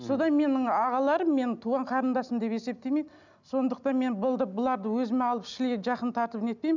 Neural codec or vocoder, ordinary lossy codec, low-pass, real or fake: none; none; 7.2 kHz; real